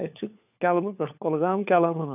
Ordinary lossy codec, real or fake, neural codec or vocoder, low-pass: none; fake; codec, 16 kHz, 4 kbps, FunCodec, trained on LibriTTS, 50 frames a second; 3.6 kHz